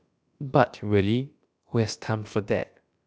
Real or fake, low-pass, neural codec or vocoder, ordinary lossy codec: fake; none; codec, 16 kHz, about 1 kbps, DyCAST, with the encoder's durations; none